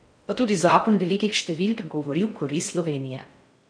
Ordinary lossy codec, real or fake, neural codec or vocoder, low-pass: none; fake; codec, 16 kHz in and 24 kHz out, 0.6 kbps, FocalCodec, streaming, 4096 codes; 9.9 kHz